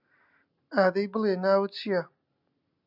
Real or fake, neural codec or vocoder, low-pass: real; none; 5.4 kHz